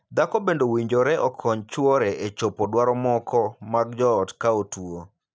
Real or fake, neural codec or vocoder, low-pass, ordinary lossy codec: real; none; none; none